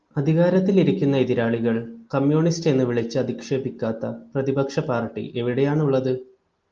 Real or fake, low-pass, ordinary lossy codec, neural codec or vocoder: real; 7.2 kHz; Opus, 24 kbps; none